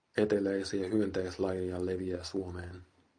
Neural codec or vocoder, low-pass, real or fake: none; 9.9 kHz; real